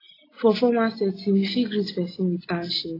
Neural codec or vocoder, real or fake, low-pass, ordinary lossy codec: none; real; 5.4 kHz; AAC, 24 kbps